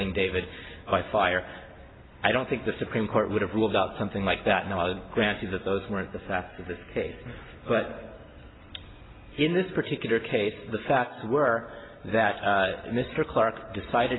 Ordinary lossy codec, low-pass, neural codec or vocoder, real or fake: AAC, 16 kbps; 7.2 kHz; none; real